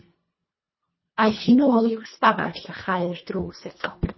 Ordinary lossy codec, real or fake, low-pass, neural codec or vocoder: MP3, 24 kbps; fake; 7.2 kHz; codec, 24 kHz, 1.5 kbps, HILCodec